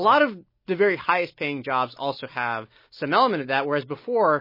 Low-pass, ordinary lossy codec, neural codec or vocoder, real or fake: 5.4 kHz; MP3, 24 kbps; none; real